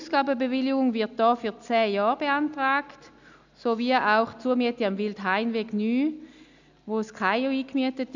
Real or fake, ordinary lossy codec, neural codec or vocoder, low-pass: real; none; none; 7.2 kHz